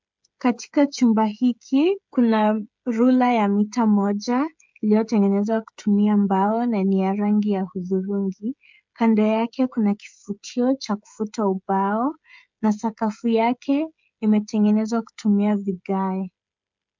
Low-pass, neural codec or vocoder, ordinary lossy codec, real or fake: 7.2 kHz; codec, 16 kHz, 16 kbps, FreqCodec, smaller model; MP3, 64 kbps; fake